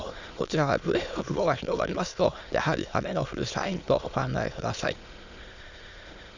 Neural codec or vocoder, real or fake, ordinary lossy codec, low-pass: autoencoder, 22.05 kHz, a latent of 192 numbers a frame, VITS, trained on many speakers; fake; Opus, 64 kbps; 7.2 kHz